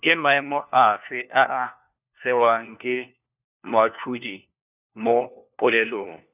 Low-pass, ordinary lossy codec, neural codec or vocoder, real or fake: 3.6 kHz; none; codec, 16 kHz, 1 kbps, FunCodec, trained on LibriTTS, 50 frames a second; fake